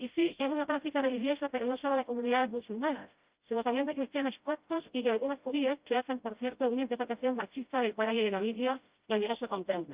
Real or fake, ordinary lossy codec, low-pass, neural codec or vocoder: fake; Opus, 32 kbps; 3.6 kHz; codec, 16 kHz, 0.5 kbps, FreqCodec, smaller model